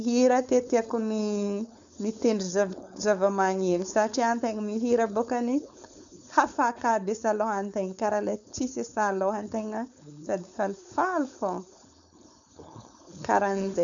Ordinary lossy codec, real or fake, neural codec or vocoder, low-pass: none; fake; codec, 16 kHz, 4.8 kbps, FACodec; 7.2 kHz